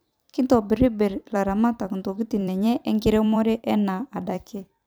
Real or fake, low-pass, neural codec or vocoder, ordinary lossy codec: fake; none; vocoder, 44.1 kHz, 128 mel bands every 256 samples, BigVGAN v2; none